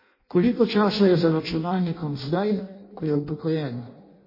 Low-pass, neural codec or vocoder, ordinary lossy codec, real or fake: 5.4 kHz; codec, 16 kHz in and 24 kHz out, 0.6 kbps, FireRedTTS-2 codec; MP3, 24 kbps; fake